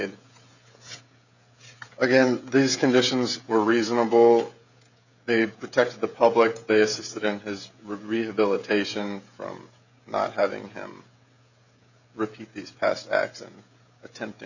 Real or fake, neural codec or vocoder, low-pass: fake; codec, 16 kHz, 16 kbps, FreqCodec, smaller model; 7.2 kHz